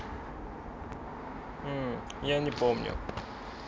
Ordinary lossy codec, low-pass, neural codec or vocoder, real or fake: none; none; none; real